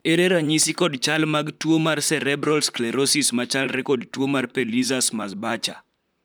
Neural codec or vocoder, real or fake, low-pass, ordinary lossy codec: vocoder, 44.1 kHz, 128 mel bands, Pupu-Vocoder; fake; none; none